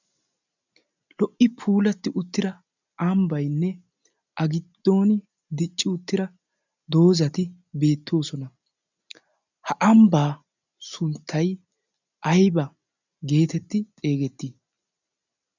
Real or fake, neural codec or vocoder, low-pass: real; none; 7.2 kHz